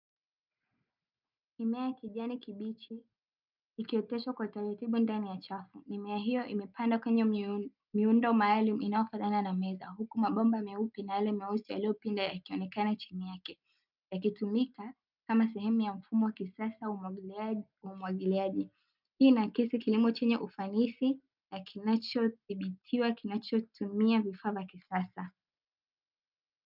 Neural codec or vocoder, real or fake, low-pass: none; real; 5.4 kHz